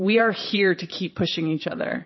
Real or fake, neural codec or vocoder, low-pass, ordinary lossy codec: fake; vocoder, 22.05 kHz, 80 mel bands, Vocos; 7.2 kHz; MP3, 24 kbps